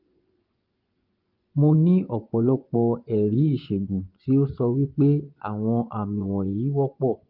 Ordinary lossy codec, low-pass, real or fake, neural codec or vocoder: Opus, 32 kbps; 5.4 kHz; fake; vocoder, 24 kHz, 100 mel bands, Vocos